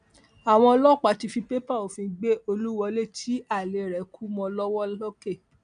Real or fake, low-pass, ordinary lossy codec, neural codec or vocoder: real; 9.9 kHz; MP3, 64 kbps; none